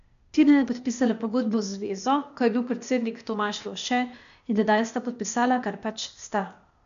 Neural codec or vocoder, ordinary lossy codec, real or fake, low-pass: codec, 16 kHz, 0.8 kbps, ZipCodec; none; fake; 7.2 kHz